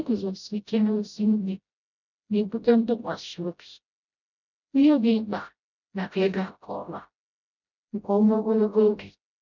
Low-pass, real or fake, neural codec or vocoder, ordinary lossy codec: 7.2 kHz; fake; codec, 16 kHz, 0.5 kbps, FreqCodec, smaller model; none